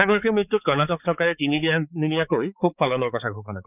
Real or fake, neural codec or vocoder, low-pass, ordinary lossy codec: fake; codec, 16 kHz, 4 kbps, X-Codec, HuBERT features, trained on balanced general audio; 3.6 kHz; none